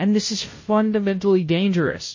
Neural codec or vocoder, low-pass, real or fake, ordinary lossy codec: codec, 16 kHz, 0.5 kbps, FunCodec, trained on Chinese and English, 25 frames a second; 7.2 kHz; fake; MP3, 32 kbps